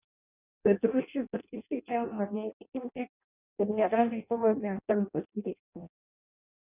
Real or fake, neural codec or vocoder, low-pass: fake; codec, 16 kHz in and 24 kHz out, 0.6 kbps, FireRedTTS-2 codec; 3.6 kHz